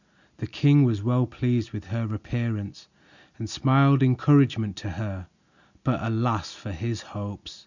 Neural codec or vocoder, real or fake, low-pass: none; real; 7.2 kHz